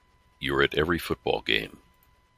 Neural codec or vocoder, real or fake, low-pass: none; real; 14.4 kHz